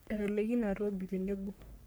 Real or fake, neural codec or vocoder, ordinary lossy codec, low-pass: fake; codec, 44.1 kHz, 3.4 kbps, Pupu-Codec; none; none